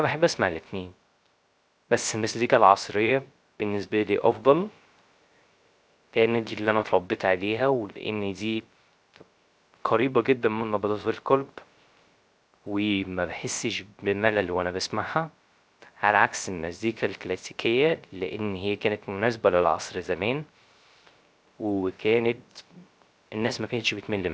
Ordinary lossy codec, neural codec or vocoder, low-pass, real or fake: none; codec, 16 kHz, 0.3 kbps, FocalCodec; none; fake